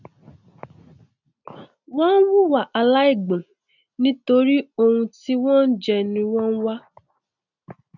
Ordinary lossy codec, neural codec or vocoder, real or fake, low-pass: none; none; real; 7.2 kHz